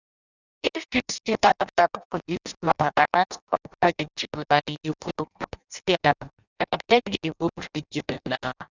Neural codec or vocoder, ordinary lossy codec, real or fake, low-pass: codec, 16 kHz in and 24 kHz out, 0.6 kbps, FireRedTTS-2 codec; none; fake; 7.2 kHz